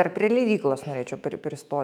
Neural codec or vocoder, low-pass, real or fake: autoencoder, 48 kHz, 128 numbers a frame, DAC-VAE, trained on Japanese speech; 19.8 kHz; fake